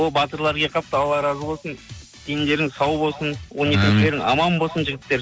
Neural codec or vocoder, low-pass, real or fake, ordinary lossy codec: none; none; real; none